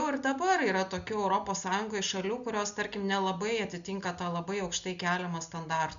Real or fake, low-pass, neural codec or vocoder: real; 7.2 kHz; none